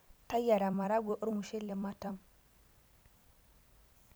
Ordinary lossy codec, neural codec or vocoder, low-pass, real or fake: none; vocoder, 44.1 kHz, 128 mel bands every 256 samples, BigVGAN v2; none; fake